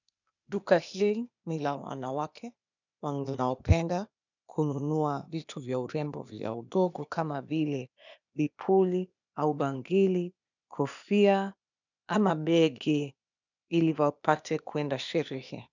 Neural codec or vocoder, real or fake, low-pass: codec, 16 kHz, 0.8 kbps, ZipCodec; fake; 7.2 kHz